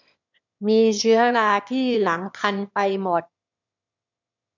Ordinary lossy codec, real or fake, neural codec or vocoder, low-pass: none; fake; autoencoder, 22.05 kHz, a latent of 192 numbers a frame, VITS, trained on one speaker; 7.2 kHz